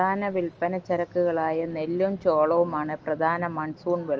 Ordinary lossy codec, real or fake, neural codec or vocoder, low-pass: Opus, 24 kbps; real; none; 7.2 kHz